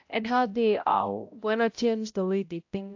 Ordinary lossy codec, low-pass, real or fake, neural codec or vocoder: AAC, 48 kbps; 7.2 kHz; fake; codec, 16 kHz, 0.5 kbps, X-Codec, HuBERT features, trained on balanced general audio